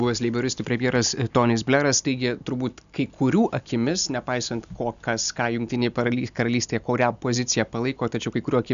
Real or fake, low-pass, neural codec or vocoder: real; 7.2 kHz; none